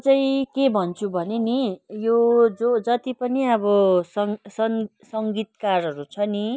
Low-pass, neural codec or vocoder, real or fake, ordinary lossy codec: none; none; real; none